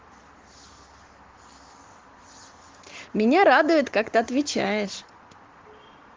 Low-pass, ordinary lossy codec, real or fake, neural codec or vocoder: 7.2 kHz; Opus, 16 kbps; real; none